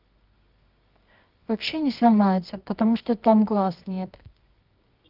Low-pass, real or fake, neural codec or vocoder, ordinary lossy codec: 5.4 kHz; fake; codec, 24 kHz, 0.9 kbps, WavTokenizer, medium music audio release; Opus, 32 kbps